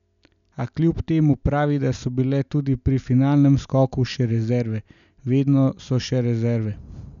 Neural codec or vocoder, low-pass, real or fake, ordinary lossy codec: none; 7.2 kHz; real; none